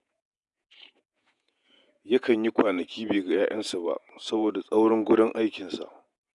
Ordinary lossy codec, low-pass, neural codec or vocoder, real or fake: none; 10.8 kHz; vocoder, 48 kHz, 128 mel bands, Vocos; fake